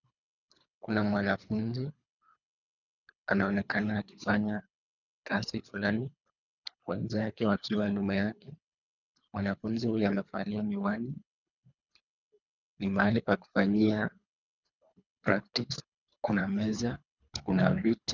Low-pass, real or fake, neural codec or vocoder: 7.2 kHz; fake; codec, 24 kHz, 3 kbps, HILCodec